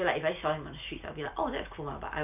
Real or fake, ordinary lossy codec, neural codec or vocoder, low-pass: real; none; none; 3.6 kHz